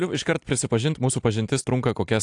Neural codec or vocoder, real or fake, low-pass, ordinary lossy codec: none; real; 10.8 kHz; AAC, 64 kbps